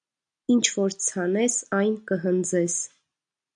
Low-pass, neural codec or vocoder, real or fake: 9.9 kHz; none; real